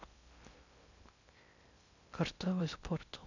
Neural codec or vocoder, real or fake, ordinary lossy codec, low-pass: codec, 16 kHz in and 24 kHz out, 0.6 kbps, FocalCodec, streaming, 2048 codes; fake; none; 7.2 kHz